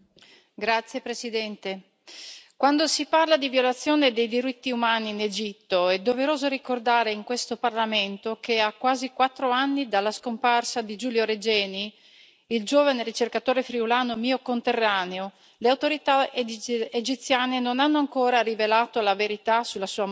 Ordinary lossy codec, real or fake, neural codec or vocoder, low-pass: none; real; none; none